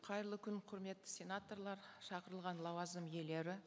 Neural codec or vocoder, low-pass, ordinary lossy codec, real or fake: none; none; none; real